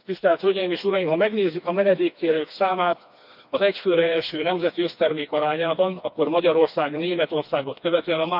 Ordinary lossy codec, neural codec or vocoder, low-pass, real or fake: none; codec, 16 kHz, 2 kbps, FreqCodec, smaller model; 5.4 kHz; fake